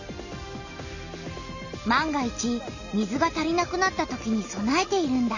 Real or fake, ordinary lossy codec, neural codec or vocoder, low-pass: real; none; none; 7.2 kHz